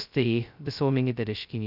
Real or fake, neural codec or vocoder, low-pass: fake; codec, 16 kHz, 0.2 kbps, FocalCodec; 5.4 kHz